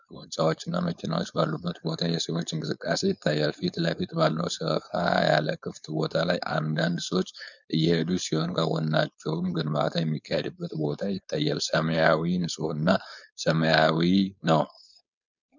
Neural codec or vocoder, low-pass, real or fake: codec, 16 kHz, 4.8 kbps, FACodec; 7.2 kHz; fake